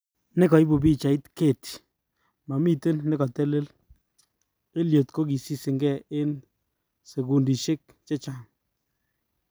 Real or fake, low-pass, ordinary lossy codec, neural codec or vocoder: real; none; none; none